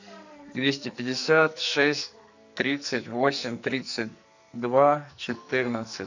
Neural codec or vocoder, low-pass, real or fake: codec, 44.1 kHz, 2.6 kbps, SNAC; 7.2 kHz; fake